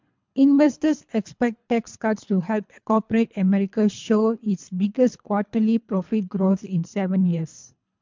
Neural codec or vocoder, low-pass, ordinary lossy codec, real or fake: codec, 24 kHz, 3 kbps, HILCodec; 7.2 kHz; MP3, 64 kbps; fake